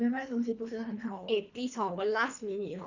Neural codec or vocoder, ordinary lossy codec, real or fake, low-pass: codec, 24 kHz, 3 kbps, HILCodec; none; fake; 7.2 kHz